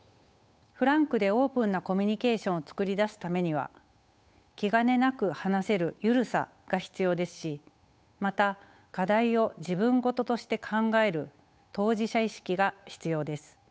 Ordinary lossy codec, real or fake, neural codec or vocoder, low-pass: none; fake; codec, 16 kHz, 8 kbps, FunCodec, trained on Chinese and English, 25 frames a second; none